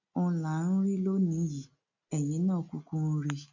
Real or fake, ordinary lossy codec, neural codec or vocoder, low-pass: real; none; none; 7.2 kHz